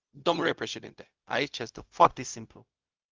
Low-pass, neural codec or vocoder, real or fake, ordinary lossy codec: 7.2 kHz; codec, 16 kHz, 0.4 kbps, LongCat-Audio-Codec; fake; Opus, 24 kbps